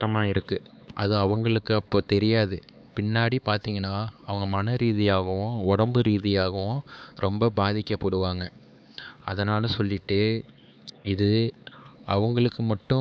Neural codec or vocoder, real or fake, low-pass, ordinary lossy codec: codec, 16 kHz, 4 kbps, X-Codec, HuBERT features, trained on LibriSpeech; fake; none; none